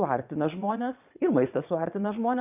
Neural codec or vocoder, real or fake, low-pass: vocoder, 22.05 kHz, 80 mel bands, Vocos; fake; 3.6 kHz